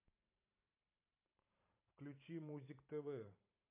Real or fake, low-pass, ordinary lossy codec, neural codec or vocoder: fake; 3.6 kHz; MP3, 24 kbps; vocoder, 44.1 kHz, 128 mel bands every 512 samples, BigVGAN v2